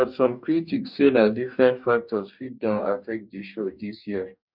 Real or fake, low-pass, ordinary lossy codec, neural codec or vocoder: fake; 5.4 kHz; none; codec, 44.1 kHz, 2.6 kbps, DAC